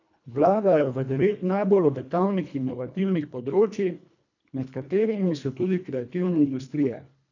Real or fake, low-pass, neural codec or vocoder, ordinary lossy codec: fake; 7.2 kHz; codec, 24 kHz, 1.5 kbps, HILCodec; none